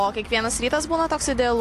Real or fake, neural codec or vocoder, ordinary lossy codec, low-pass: real; none; AAC, 64 kbps; 14.4 kHz